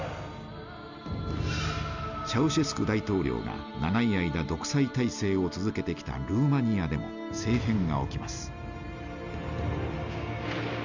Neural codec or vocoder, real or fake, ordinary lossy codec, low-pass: none; real; Opus, 64 kbps; 7.2 kHz